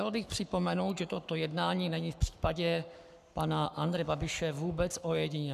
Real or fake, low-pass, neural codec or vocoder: fake; 14.4 kHz; codec, 44.1 kHz, 7.8 kbps, Pupu-Codec